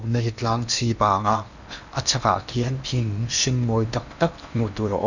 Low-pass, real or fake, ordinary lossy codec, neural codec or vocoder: 7.2 kHz; fake; none; codec, 16 kHz in and 24 kHz out, 0.8 kbps, FocalCodec, streaming, 65536 codes